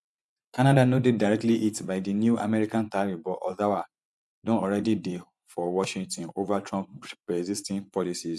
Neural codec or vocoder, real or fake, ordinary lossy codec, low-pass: vocoder, 24 kHz, 100 mel bands, Vocos; fake; none; none